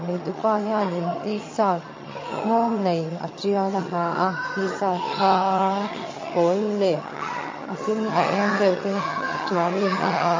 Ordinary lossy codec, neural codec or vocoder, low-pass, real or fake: MP3, 32 kbps; vocoder, 22.05 kHz, 80 mel bands, HiFi-GAN; 7.2 kHz; fake